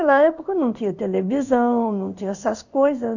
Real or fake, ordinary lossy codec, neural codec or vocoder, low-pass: fake; none; codec, 16 kHz in and 24 kHz out, 1 kbps, XY-Tokenizer; 7.2 kHz